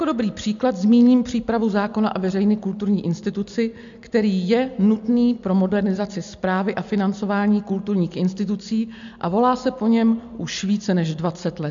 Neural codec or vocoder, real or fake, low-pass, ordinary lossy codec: none; real; 7.2 kHz; MP3, 64 kbps